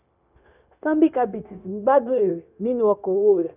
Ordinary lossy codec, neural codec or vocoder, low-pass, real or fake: none; codec, 16 kHz, 0.9 kbps, LongCat-Audio-Codec; 3.6 kHz; fake